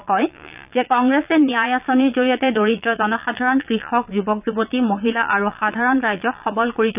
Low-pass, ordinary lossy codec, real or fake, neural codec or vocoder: 3.6 kHz; none; fake; vocoder, 22.05 kHz, 80 mel bands, Vocos